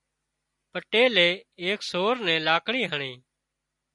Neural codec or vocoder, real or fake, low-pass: none; real; 10.8 kHz